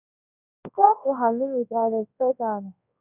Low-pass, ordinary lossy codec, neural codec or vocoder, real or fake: 3.6 kHz; none; codec, 24 kHz, 0.9 kbps, WavTokenizer, large speech release; fake